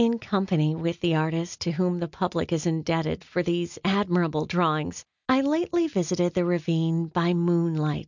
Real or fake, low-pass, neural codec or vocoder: real; 7.2 kHz; none